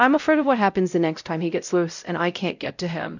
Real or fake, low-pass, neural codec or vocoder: fake; 7.2 kHz; codec, 16 kHz, 0.5 kbps, X-Codec, WavLM features, trained on Multilingual LibriSpeech